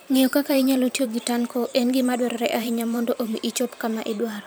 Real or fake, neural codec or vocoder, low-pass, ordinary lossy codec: fake; vocoder, 44.1 kHz, 128 mel bands every 512 samples, BigVGAN v2; none; none